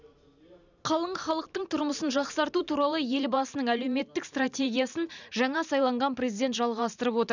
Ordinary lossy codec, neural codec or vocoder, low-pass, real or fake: none; vocoder, 44.1 kHz, 128 mel bands every 512 samples, BigVGAN v2; 7.2 kHz; fake